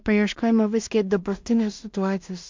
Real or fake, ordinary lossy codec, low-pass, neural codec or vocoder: fake; MP3, 64 kbps; 7.2 kHz; codec, 16 kHz in and 24 kHz out, 0.4 kbps, LongCat-Audio-Codec, two codebook decoder